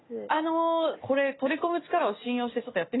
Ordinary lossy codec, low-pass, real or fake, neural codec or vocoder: AAC, 16 kbps; 7.2 kHz; real; none